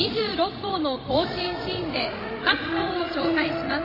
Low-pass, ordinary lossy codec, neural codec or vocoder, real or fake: 5.4 kHz; MP3, 24 kbps; codec, 16 kHz, 16 kbps, FreqCodec, larger model; fake